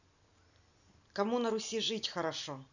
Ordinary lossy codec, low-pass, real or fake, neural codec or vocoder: none; 7.2 kHz; real; none